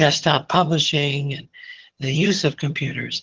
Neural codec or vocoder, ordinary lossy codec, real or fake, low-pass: vocoder, 22.05 kHz, 80 mel bands, HiFi-GAN; Opus, 16 kbps; fake; 7.2 kHz